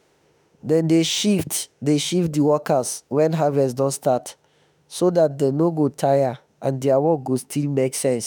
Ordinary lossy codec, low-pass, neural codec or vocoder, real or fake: none; none; autoencoder, 48 kHz, 32 numbers a frame, DAC-VAE, trained on Japanese speech; fake